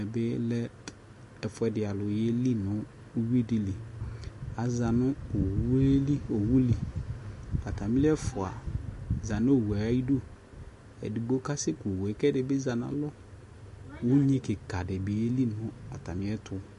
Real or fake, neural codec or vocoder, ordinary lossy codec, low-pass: real; none; MP3, 48 kbps; 14.4 kHz